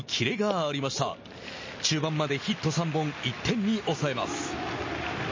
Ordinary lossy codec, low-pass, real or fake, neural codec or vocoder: MP3, 32 kbps; 7.2 kHz; real; none